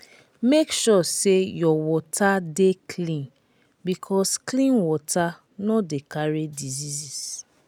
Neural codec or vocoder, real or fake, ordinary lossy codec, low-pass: none; real; none; none